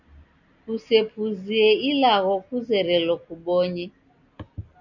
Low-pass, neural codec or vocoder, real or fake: 7.2 kHz; none; real